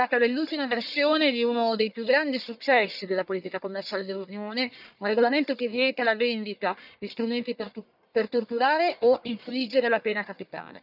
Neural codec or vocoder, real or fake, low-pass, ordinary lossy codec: codec, 44.1 kHz, 1.7 kbps, Pupu-Codec; fake; 5.4 kHz; none